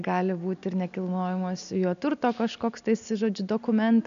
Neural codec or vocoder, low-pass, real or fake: none; 7.2 kHz; real